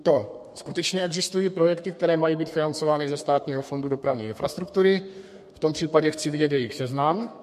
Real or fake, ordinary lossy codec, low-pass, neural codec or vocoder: fake; MP3, 64 kbps; 14.4 kHz; codec, 32 kHz, 1.9 kbps, SNAC